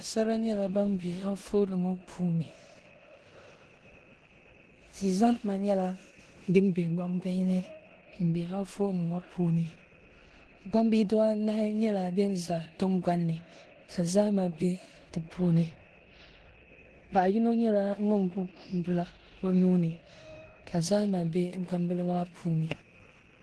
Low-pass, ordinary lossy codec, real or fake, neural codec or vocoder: 10.8 kHz; Opus, 16 kbps; fake; codec, 16 kHz in and 24 kHz out, 0.9 kbps, LongCat-Audio-Codec, four codebook decoder